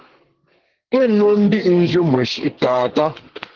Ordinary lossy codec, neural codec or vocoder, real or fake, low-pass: Opus, 16 kbps; codec, 32 kHz, 1.9 kbps, SNAC; fake; 7.2 kHz